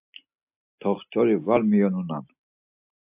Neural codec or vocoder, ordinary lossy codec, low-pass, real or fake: none; AAC, 32 kbps; 3.6 kHz; real